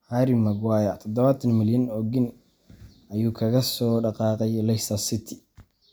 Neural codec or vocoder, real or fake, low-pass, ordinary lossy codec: none; real; none; none